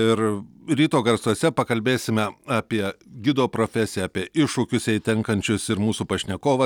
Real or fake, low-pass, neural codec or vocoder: real; 19.8 kHz; none